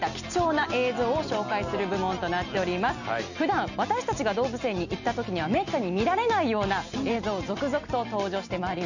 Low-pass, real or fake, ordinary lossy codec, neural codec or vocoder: 7.2 kHz; real; none; none